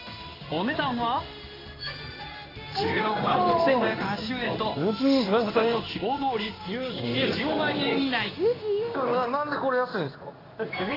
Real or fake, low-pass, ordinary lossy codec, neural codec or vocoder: fake; 5.4 kHz; AAC, 24 kbps; codec, 16 kHz in and 24 kHz out, 1 kbps, XY-Tokenizer